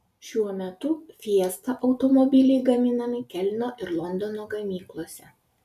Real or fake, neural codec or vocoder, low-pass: real; none; 14.4 kHz